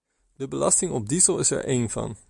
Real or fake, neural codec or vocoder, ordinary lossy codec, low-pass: real; none; MP3, 96 kbps; 10.8 kHz